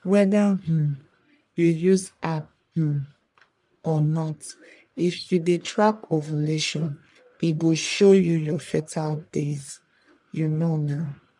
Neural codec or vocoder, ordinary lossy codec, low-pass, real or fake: codec, 44.1 kHz, 1.7 kbps, Pupu-Codec; none; 10.8 kHz; fake